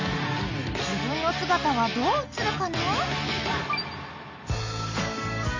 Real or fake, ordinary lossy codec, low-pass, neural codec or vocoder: real; MP3, 64 kbps; 7.2 kHz; none